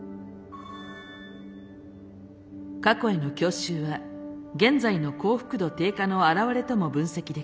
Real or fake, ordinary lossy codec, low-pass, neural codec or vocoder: real; none; none; none